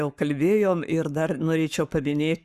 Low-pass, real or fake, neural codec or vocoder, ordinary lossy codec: 14.4 kHz; fake; codec, 44.1 kHz, 7.8 kbps, Pupu-Codec; Opus, 64 kbps